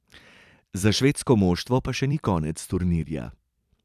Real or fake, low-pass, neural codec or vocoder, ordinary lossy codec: fake; 14.4 kHz; vocoder, 44.1 kHz, 128 mel bands every 256 samples, BigVGAN v2; none